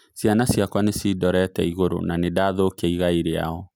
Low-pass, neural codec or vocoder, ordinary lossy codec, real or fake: none; none; none; real